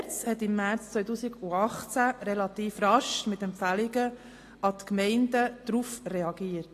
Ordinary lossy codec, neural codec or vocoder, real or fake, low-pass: AAC, 48 kbps; none; real; 14.4 kHz